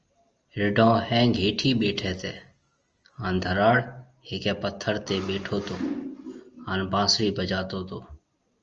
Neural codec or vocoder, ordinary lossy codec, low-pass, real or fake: none; Opus, 24 kbps; 7.2 kHz; real